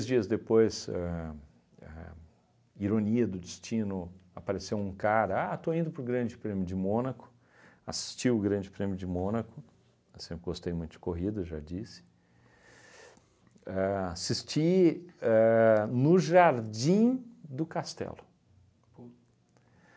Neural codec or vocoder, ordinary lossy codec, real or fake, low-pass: none; none; real; none